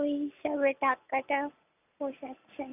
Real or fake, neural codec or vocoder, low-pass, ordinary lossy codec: real; none; 3.6 kHz; none